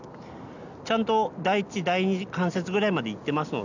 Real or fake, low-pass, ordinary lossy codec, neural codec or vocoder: real; 7.2 kHz; none; none